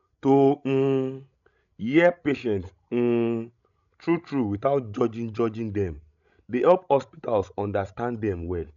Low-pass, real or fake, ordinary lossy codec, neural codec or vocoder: 7.2 kHz; fake; MP3, 96 kbps; codec, 16 kHz, 16 kbps, FreqCodec, larger model